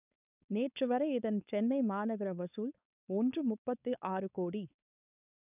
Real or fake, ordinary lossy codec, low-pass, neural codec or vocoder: fake; none; 3.6 kHz; codec, 16 kHz, 4.8 kbps, FACodec